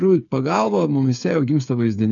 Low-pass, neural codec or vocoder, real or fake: 7.2 kHz; codec, 16 kHz, 8 kbps, FreqCodec, smaller model; fake